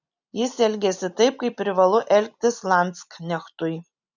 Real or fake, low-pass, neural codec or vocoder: real; 7.2 kHz; none